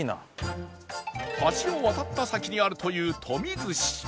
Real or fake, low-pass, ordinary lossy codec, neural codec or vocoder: real; none; none; none